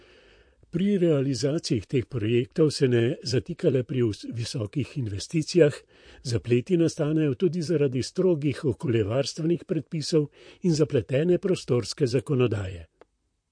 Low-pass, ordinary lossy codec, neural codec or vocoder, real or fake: 9.9 kHz; MP3, 48 kbps; vocoder, 44.1 kHz, 128 mel bands, Pupu-Vocoder; fake